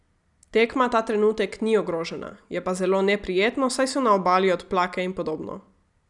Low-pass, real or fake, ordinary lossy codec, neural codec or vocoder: 10.8 kHz; real; none; none